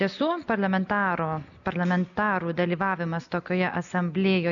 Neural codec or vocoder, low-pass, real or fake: none; 7.2 kHz; real